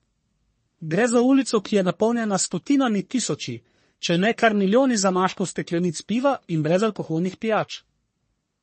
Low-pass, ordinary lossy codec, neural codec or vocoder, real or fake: 10.8 kHz; MP3, 32 kbps; codec, 44.1 kHz, 1.7 kbps, Pupu-Codec; fake